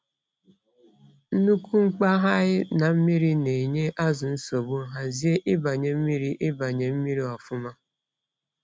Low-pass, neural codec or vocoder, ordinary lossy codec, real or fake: none; none; none; real